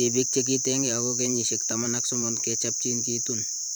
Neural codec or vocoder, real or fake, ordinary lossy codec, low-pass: vocoder, 44.1 kHz, 128 mel bands every 512 samples, BigVGAN v2; fake; none; none